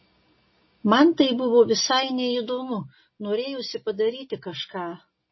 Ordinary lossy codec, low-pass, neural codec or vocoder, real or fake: MP3, 24 kbps; 7.2 kHz; none; real